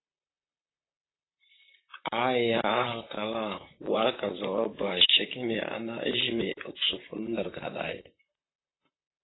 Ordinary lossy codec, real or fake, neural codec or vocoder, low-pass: AAC, 16 kbps; fake; vocoder, 44.1 kHz, 128 mel bands, Pupu-Vocoder; 7.2 kHz